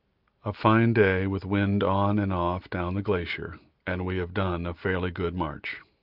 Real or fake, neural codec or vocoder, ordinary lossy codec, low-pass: real; none; Opus, 32 kbps; 5.4 kHz